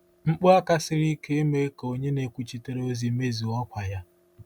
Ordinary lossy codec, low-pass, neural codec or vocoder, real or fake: none; 19.8 kHz; none; real